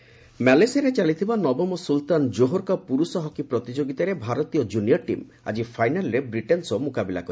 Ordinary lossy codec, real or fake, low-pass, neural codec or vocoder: none; real; none; none